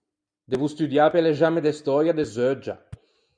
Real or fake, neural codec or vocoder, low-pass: real; none; 9.9 kHz